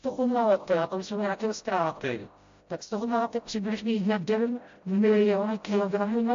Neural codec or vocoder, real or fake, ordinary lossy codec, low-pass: codec, 16 kHz, 0.5 kbps, FreqCodec, smaller model; fake; AAC, 48 kbps; 7.2 kHz